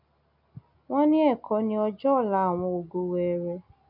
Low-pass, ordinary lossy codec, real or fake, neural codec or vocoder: 5.4 kHz; none; real; none